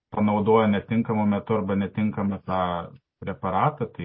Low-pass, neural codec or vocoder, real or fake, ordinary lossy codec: 7.2 kHz; none; real; MP3, 24 kbps